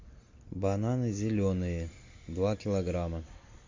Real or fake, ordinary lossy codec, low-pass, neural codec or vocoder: real; MP3, 48 kbps; 7.2 kHz; none